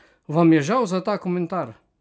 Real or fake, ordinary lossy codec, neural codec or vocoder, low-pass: real; none; none; none